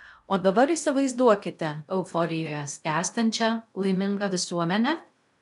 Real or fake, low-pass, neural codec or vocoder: fake; 10.8 kHz; codec, 16 kHz in and 24 kHz out, 0.6 kbps, FocalCodec, streaming, 2048 codes